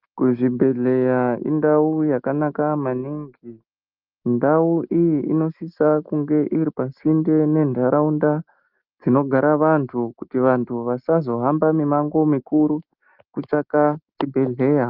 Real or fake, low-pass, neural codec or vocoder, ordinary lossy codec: real; 5.4 kHz; none; Opus, 24 kbps